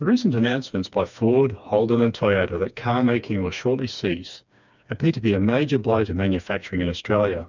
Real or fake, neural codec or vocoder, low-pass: fake; codec, 16 kHz, 2 kbps, FreqCodec, smaller model; 7.2 kHz